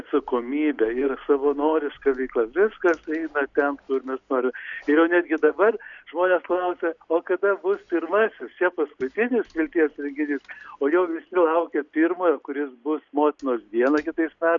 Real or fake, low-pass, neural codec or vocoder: real; 7.2 kHz; none